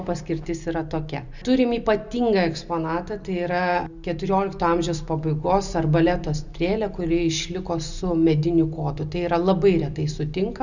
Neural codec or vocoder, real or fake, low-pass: none; real; 7.2 kHz